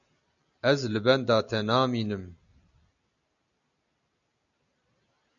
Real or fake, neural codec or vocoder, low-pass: real; none; 7.2 kHz